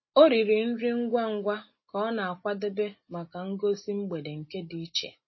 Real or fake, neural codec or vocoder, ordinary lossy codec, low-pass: real; none; MP3, 24 kbps; 7.2 kHz